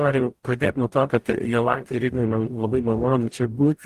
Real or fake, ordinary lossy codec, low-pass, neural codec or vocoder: fake; Opus, 24 kbps; 14.4 kHz; codec, 44.1 kHz, 0.9 kbps, DAC